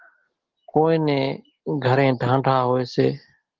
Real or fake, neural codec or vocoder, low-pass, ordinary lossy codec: real; none; 7.2 kHz; Opus, 16 kbps